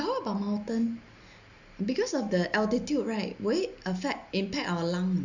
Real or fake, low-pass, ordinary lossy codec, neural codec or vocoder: real; 7.2 kHz; none; none